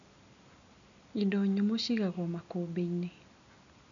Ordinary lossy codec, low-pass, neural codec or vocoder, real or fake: MP3, 96 kbps; 7.2 kHz; none; real